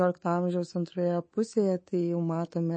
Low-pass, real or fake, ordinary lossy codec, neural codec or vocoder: 9.9 kHz; fake; MP3, 32 kbps; codec, 24 kHz, 3.1 kbps, DualCodec